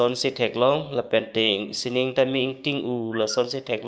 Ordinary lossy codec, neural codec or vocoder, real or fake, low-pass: none; codec, 16 kHz, 6 kbps, DAC; fake; none